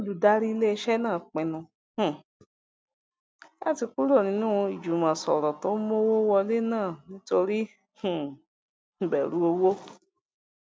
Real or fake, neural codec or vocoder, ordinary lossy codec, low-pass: real; none; none; none